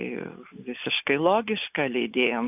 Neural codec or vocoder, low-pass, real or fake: none; 3.6 kHz; real